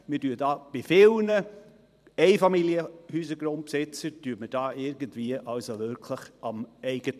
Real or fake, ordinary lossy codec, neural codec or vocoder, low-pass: fake; none; vocoder, 44.1 kHz, 128 mel bands every 512 samples, BigVGAN v2; 14.4 kHz